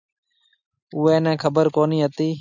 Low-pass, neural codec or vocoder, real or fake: 7.2 kHz; none; real